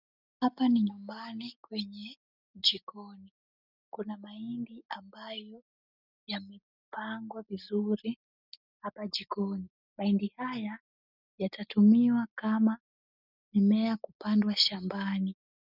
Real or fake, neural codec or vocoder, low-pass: real; none; 5.4 kHz